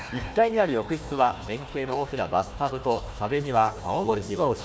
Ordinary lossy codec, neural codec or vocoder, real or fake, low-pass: none; codec, 16 kHz, 1 kbps, FunCodec, trained on Chinese and English, 50 frames a second; fake; none